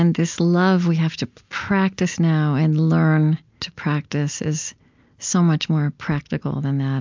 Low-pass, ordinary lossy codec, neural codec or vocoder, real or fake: 7.2 kHz; MP3, 64 kbps; none; real